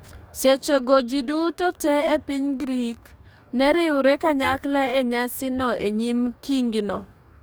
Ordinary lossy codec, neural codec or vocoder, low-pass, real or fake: none; codec, 44.1 kHz, 2.6 kbps, DAC; none; fake